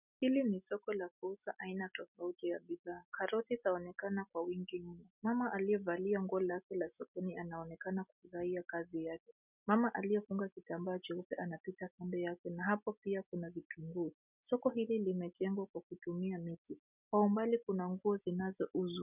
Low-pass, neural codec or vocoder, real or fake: 3.6 kHz; none; real